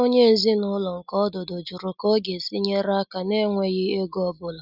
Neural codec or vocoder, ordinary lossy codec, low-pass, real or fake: none; Opus, 64 kbps; 5.4 kHz; real